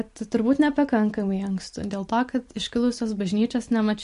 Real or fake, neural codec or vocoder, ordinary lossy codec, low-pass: real; none; MP3, 48 kbps; 10.8 kHz